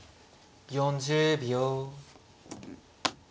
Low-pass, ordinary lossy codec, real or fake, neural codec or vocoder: none; none; real; none